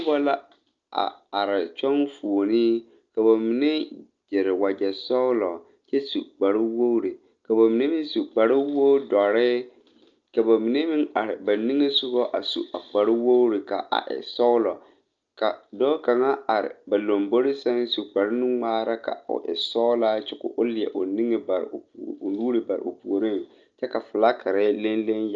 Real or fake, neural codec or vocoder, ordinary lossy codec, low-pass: real; none; Opus, 24 kbps; 7.2 kHz